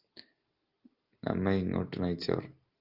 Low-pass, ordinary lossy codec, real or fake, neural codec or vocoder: 5.4 kHz; Opus, 32 kbps; real; none